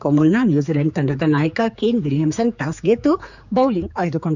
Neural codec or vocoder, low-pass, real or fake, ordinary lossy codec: codec, 16 kHz, 4 kbps, X-Codec, HuBERT features, trained on general audio; 7.2 kHz; fake; none